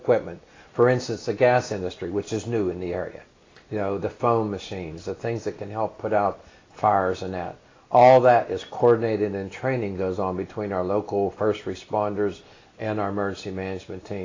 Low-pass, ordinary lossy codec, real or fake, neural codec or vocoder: 7.2 kHz; AAC, 32 kbps; real; none